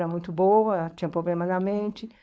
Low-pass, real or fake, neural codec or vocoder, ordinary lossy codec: none; fake; codec, 16 kHz, 4.8 kbps, FACodec; none